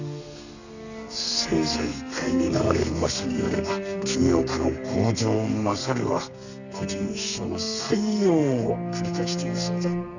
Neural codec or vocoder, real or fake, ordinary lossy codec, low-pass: codec, 32 kHz, 1.9 kbps, SNAC; fake; none; 7.2 kHz